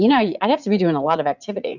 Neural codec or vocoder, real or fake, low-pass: vocoder, 44.1 kHz, 80 mel bands, Vocos; fake; 7.2 kHz